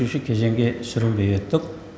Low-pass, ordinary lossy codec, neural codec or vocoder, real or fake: none; none; none; real